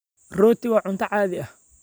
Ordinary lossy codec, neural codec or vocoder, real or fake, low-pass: none; none; real; none